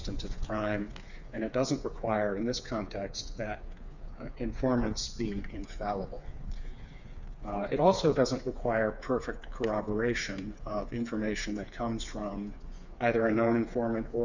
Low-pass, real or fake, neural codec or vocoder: 7.2 kHz; fake; codec, 16 kHz, 4 kbps, FreqCodec, smaller model